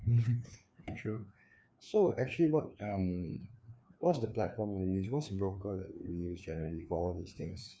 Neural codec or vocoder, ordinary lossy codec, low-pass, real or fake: codec, 16 kHz, 2 kbps, FreqCodec, larger model; none; none; fake